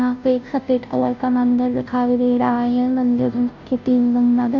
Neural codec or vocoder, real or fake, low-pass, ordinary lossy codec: codec, 16 kHz, 0.5 kbps, FunCodec, trained on Chinese and English, 25 frames a second; fake; 7.2 kHz; none